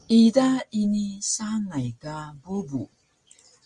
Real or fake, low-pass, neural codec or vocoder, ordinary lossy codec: real; 10.8 kHz; none; Opus, 32 kbps